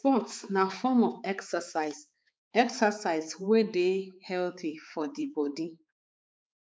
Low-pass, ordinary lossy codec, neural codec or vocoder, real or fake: none; none; codec, 16 kHz, 4 kbps, X-Codec, HuBERT features, trained on balanced general audio; fake